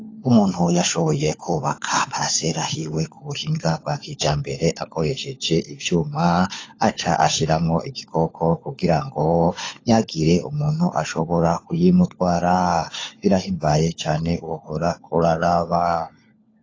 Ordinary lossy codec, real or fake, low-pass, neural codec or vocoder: AAC, 32 kbps; fake; 7.2 kHz; codec, 16 kHz, 4 kbps, FunCodec, trained on LibriTTS, 50 frames a second